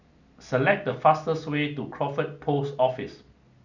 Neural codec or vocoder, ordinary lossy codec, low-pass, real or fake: none; none; 7.2 kHz; real